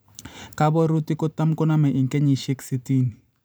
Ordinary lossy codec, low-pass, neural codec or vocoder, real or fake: none; none; vocoder, 44.1 kHz, 128 mel bands every 512 samples, BigVGAN v2; fake